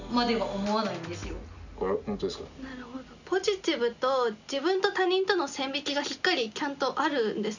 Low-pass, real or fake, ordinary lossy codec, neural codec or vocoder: 7.2 kHz; real; none; none